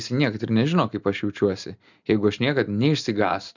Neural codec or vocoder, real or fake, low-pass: none; real; 7.2 kHz